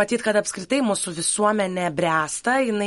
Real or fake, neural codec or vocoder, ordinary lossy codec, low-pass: real; none; MP3, 48 kbps; 14.4 kHz